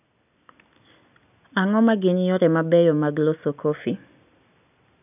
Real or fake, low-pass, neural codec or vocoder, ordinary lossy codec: real; 3.6 kHz; none; none